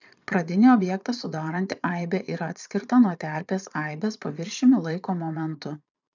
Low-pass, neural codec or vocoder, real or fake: 7.2 kHz; vocoder, 22.05 kHz, 80 mel bands, Vocos; fake